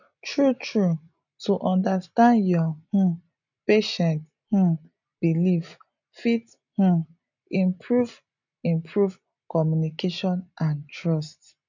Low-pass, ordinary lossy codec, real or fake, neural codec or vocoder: 7.2 kHz; none; real; none